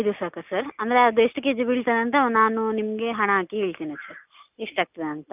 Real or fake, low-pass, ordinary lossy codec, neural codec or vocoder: real; 3.6 kHz; none; none